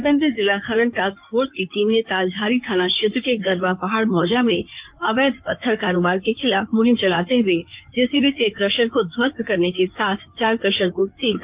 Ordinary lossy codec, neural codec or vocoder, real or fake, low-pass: Opus, 24 kbps; codec, 16 kHz in and 24 kHz out, 2.2 kbps, FireRedTTS-2 codec; fake; 3.6 kHz